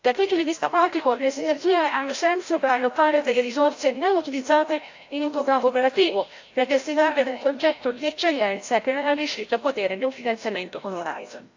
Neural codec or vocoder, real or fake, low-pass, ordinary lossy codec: codec, 16 kHz, 0.5 kbps, FreqCodec, larger model; fake; 7.2 kHz; AAC, 48 kbps